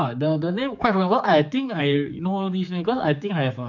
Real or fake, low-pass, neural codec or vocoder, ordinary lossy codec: fake; 7.2 kHz; codec, 16 kHz, 4 kbps, X-Codec, HuBERT features, trained on general audio; none